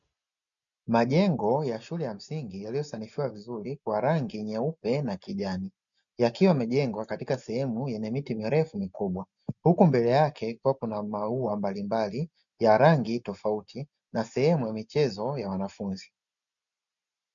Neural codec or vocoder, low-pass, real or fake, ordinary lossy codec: none; 7.2 kHz; real; MP3, 96 kbps